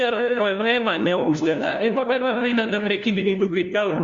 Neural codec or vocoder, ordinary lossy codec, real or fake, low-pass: codec, 16 kHz, 1 kbps, FunCodec, trained on LibriTTS, 50 frames a second; Opus, 64 kbps; fake; 7.2 kHz